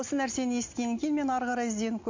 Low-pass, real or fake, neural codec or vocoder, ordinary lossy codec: 7.2 kHz; real; none; MP3, 48 kbps